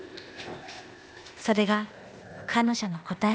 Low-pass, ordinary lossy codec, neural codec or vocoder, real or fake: none; none; codec, 16 kHz, 0.8 kbps, ZipCodec; fake